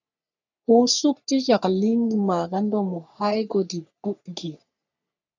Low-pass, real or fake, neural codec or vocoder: 7.2 kHz; fake; codec, 44.1 kHz, 3.4 kbps, Pupu-Codec